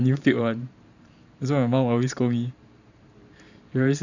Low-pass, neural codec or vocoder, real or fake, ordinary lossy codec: 7.2 kHz; vocoder, 44.1 kHz, 128 mel bands every 512 samples, BigVGAN v2; fake; none